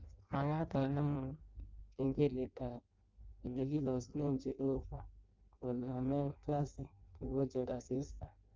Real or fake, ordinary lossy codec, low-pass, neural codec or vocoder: fake; Opus, 32 kbps; 7.2 kHz; codec, 16 kHz in and 24 kHz out, 0.6 kbps, FireRedTTS-2 codec